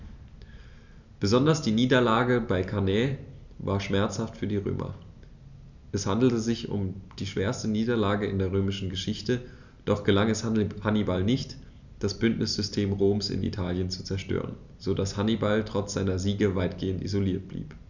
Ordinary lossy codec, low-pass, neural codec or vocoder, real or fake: none; 7.2 kHz; none; real